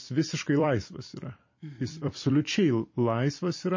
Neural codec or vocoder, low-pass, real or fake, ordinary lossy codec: vocoder, 44.1 kHz, 128 mel bands every 256 samples, BigVGAN v2; 7.2 kHz; fake; MP3, 32 kbps